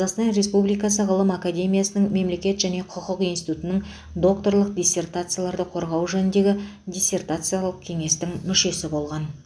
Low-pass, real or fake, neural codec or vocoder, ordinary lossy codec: none; real; none; none